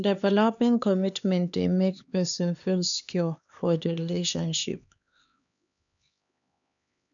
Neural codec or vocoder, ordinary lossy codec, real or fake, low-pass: codec, 16 kHz, 2 kbps, X-Codec, HuBERT features, trained on LibriSpeech; none; fake; 7.2 kHz